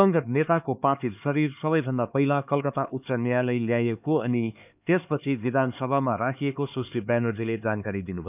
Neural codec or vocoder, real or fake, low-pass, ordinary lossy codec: codec, 16 kHz, 4 kbps, X-Codec, HuBERT features, trained on LibriSpeech; fake; 3.6 kHz; none